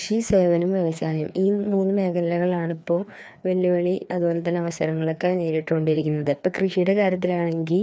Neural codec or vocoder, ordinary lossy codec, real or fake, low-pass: codec, 16 kHz, 2 kbps, FreqCodec, larger model; none; fake; none